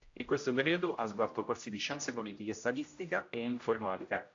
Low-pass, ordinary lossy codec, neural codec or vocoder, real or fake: 7.2 kHz; AAC, 48 kbps; codec, 16 kHz, 0.5 kbps, X-Codec, HuBERT features, trained on general audio; fake